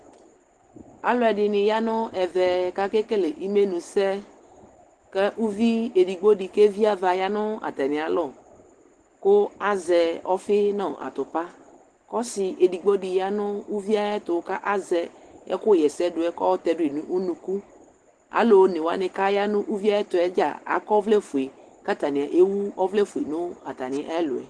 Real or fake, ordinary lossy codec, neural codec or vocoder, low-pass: real; Opus, 16 kbps; none; 10.8 kHz